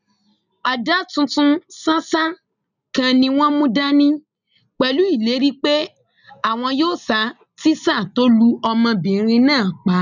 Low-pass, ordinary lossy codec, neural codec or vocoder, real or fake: 7.2 kHz; none; none; real